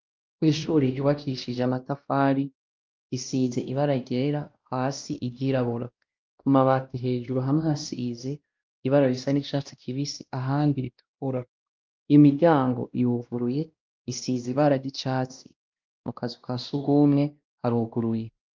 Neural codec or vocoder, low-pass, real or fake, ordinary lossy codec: codec, 16 kHz, 1 kbps, X-Codec, WavLM features, trained on Multilingual LibriSpeech; 7.2 kHz; fake; Opus, 24 kbps